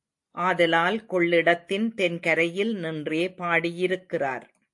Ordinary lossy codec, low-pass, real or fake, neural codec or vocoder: AAC, 64 kbps; 10.8 kHz; real; none